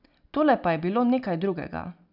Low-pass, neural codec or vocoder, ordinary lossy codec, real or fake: 5.4 kHz; none; none; real